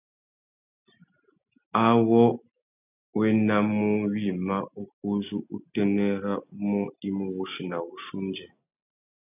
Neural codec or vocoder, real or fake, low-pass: none; real; 3.6 kHz